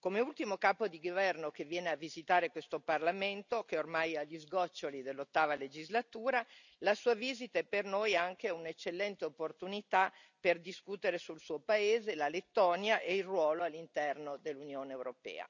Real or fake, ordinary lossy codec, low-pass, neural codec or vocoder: real; none; 7.2 kHz; none